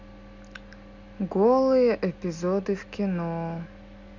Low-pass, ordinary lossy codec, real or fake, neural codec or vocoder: 7.2 kHz; none; real; none